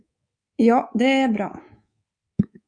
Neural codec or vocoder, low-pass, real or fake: codec, 24 kHz, 3.1 kbps, DualCodec; 9.9 kHz; fake